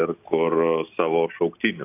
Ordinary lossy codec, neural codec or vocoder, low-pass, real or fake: AAC, 32 kbps; none; 3.6 kHz; real